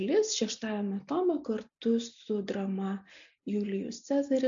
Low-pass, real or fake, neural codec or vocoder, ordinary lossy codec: 7.2 kHz; real; none; AAC, 64 kbps